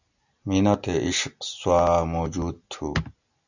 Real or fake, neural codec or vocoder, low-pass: real; none; 7.2 kHz